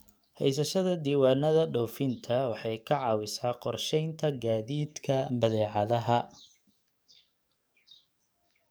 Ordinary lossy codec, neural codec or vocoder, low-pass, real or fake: none; codec, 44.1 kHz, 7.8 kbps, DAC; none; fake